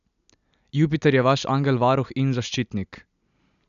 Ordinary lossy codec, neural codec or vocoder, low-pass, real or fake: none; none; 7.2 kHz; real